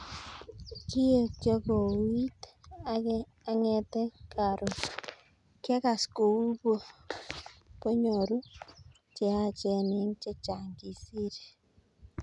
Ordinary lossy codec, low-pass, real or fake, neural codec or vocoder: none; 10.8 kHz; real; none